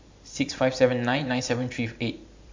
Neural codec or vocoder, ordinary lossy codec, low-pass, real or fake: vocoder, 44.1 kHz, 128 mel bands every 512 samples, BigVGAN v2; MP3, 64 kbps; 7.2 kHz; fake